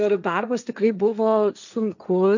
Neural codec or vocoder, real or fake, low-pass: codec, 16 kHz, 1.1 kbps, Voila-Tokenizer; fake; 7.2 kHz